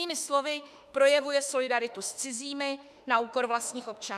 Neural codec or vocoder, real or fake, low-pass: autoencoder, 48 kHz, 32 numbers a frame, DAC-VAE, trained on Japanese speech; fake; 14.4 kHz